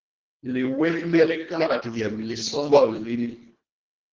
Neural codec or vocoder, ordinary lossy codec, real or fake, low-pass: codec, 24 kHz, 1.5 kbps, HILCodec; Opus, 16 kbps; fake; 7.2 kHz